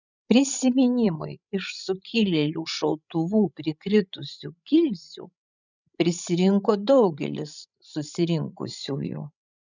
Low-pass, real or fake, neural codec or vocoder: 7.2 kHz; fake; codec, 16 kHz, 16 kbps, FreqCodec, larger model